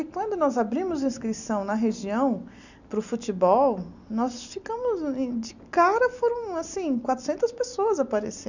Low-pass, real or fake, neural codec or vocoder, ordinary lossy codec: 7.2 kHz; real; none; none